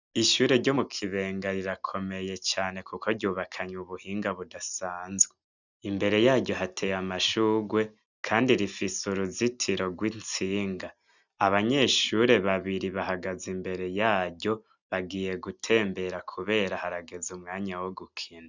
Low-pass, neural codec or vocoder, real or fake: 7.2 kHz; none; real